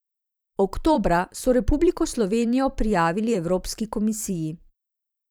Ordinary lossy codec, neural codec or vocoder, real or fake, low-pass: none; vocoder, 44.1 kHz, 128 mel bands every 512 samples, BigVGAN v2; fake; none